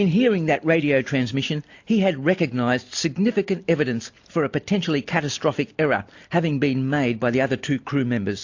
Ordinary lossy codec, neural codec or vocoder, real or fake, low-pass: AAC, 48 kbps; none; real; 7.2 kHz